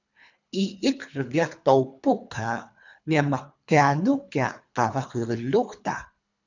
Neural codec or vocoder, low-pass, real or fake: codec, 24 kHz, 3 kbps, HILCodec; 7.2 kHz; fake